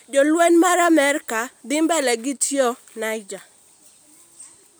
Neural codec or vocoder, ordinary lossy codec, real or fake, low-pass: none; none; real; none